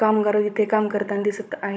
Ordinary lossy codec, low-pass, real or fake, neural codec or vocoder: none; none; fake; codec, 16 kHz, 16 kbps, FunCodec, trained on Chinese and English, 50 frames a second